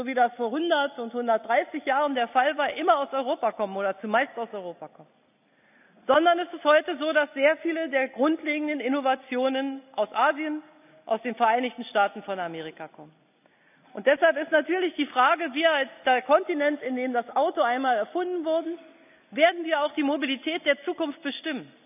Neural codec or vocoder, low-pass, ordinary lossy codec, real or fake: none; 3.6 kHz; none; real